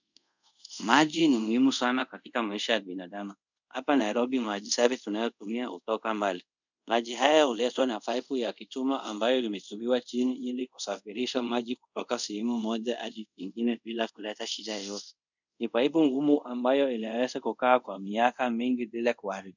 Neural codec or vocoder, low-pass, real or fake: codec, 24 kHz, 0.5 kbps, DualCodec; 7.2 kHz; fake